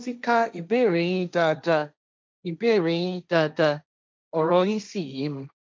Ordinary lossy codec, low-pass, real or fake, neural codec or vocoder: none; none; fake; codec, 16 kHz, 1.1 kbps, Voila-Tokenizer